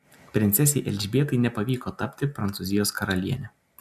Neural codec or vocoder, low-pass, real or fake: none; 14.4 kHz; real